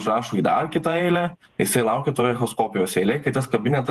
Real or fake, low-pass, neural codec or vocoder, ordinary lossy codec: fake; 14.4 kHz; vocoder, 48 kHz, 128 mel bands, Vocos; Opus, 24 kbps